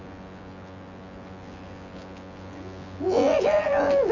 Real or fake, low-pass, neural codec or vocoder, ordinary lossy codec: fake; 7.2 kHz; vocoder, 24 kHz, 100 mel bands, Vocos; none